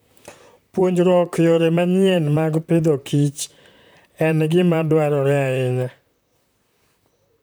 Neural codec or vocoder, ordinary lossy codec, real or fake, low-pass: vocoder, 44.1 kHz, 128 mel bands, Pupu-Vocoder; none; fake; none